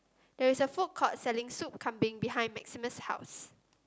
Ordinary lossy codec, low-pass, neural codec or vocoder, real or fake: none; none; none; real